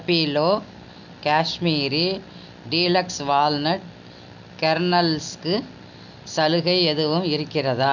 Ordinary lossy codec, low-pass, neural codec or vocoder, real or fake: none; 7.2 kHz; none; real